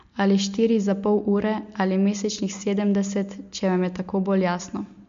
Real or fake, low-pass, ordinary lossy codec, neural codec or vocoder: real; 7.2 kHz; MP3, 48 kbps; none